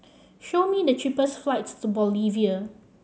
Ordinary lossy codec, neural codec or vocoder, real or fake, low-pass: none; none; real; none